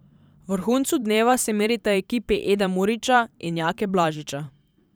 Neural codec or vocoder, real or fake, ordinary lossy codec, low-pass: vocoder, 44.1 kHz, 128 mel bands every 512 samples, BigVGAN v2; fake; none; none